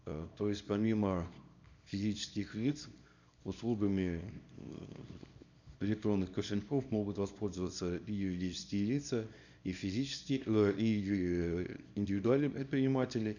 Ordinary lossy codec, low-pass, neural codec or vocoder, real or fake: none; 7.2 kHz; codec, 24 kHz, 0.9 kbps, WavTokenizer, small release; fake